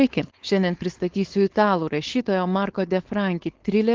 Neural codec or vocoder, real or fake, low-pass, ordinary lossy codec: codec, 16 kHz, 4.8 kbps, FACodec; fake; 7.2 kHz; Opus, 16 kbps